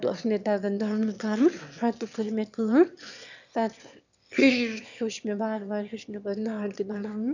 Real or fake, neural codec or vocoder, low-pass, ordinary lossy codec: fake; autoencoder, 22.05 kHz, a latent of 192 numbers a frame, VITS, trained on one speaker; 7.2 kHz; none